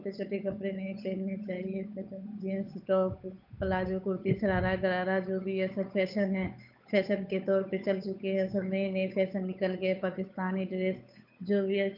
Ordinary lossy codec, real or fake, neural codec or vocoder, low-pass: none; fake; codec, 16 kHz, 8 kbps, FunCodec, trained on Chinese and English, 25 frames a second; 5.4 kHz